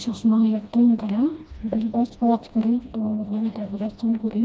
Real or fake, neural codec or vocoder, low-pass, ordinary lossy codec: fake; codec, 16 kHz, 1 kbps, FreqCodec, smaller model; none; none